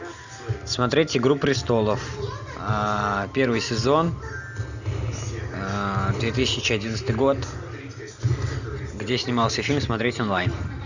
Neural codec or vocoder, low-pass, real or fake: none; 7.2 kHz; real